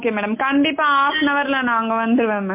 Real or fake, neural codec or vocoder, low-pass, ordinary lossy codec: real; none; 3.6 kHz; MP3, 24 kbps